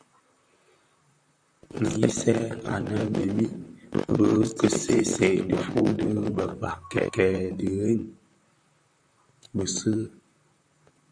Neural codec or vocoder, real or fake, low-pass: vocoder, 44.1 kHz, 128 mel bands, Pupu-Vocoder; fake; 9.9 kHz